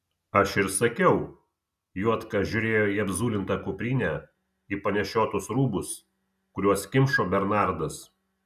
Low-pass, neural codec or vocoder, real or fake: 14.4 kHz; none; real